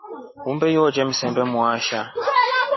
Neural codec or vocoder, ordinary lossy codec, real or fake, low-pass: none; MP3, 24 kbps; real; 7.2 kHz